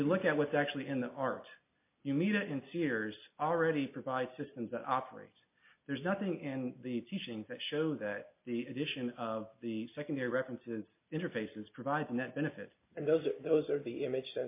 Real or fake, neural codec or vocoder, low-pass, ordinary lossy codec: real; none; 3.6 kHz; MP3, 24 kbps